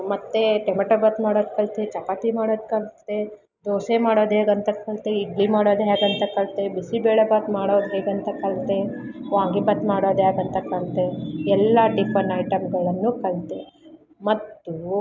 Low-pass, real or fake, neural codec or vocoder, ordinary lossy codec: 7.2 kHz; real; none; none